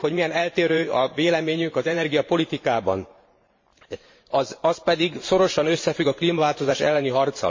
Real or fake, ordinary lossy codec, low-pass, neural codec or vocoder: fake; MP3, 32 kbps; 7.2 kHz; vocoder, 44.1 kHz, 128 mel bands every 512 samples, BigVGAN v2